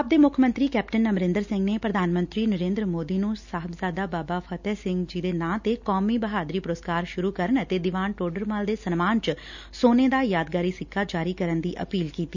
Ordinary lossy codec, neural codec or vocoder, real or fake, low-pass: none; none; real; 7.2 kHz